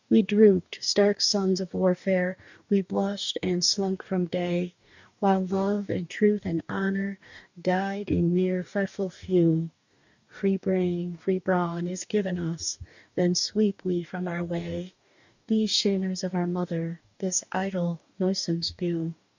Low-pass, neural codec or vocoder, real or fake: 7.2 kHz; codec, 44.1 kHz, 2.6 kbps, DAC; fake